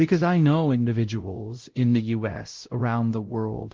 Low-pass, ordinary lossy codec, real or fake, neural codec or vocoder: 7.2 kHz; Opus, 16 kbps; fake; codec, 16 kHz, 0.5 kbps, X-Codec, WavLM features, trained on Multilingual LibriSpeech